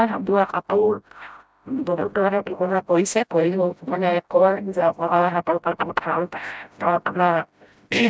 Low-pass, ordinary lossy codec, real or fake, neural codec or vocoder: none; none; fake; codec, 16 kHz, 0.5 kbps, FreqCodec, smaller model